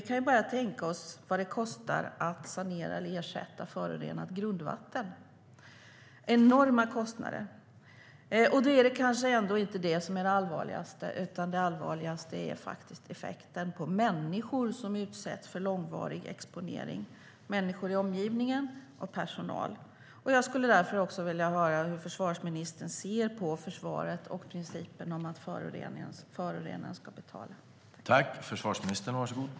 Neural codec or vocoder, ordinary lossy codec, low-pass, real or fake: none; none; none; real